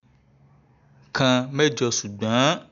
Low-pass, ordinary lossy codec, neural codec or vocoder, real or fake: 7.2 kHz; none; none; real